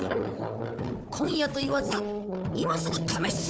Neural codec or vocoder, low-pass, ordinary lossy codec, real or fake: codec, 16 kHz, 4 kbps, FunCodec, trained on Chinese and English, 50 frames a second; none; none; fake